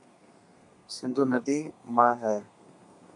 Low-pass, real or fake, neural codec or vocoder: 10.8 kHz; fake; codec, 32 kHz, 1.9 kbps, SNAC